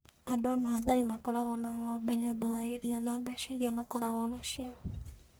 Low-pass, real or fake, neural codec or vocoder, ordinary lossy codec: none; fake; codec, 44.1 kHz, 1.7 kbps, Pupu-Codec; none